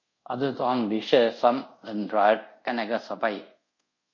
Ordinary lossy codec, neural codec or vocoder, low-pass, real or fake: MP3, 32 kbps; codec, 24 kHz, 0.5 kbps, DualCodec; 7.2 kHz; fake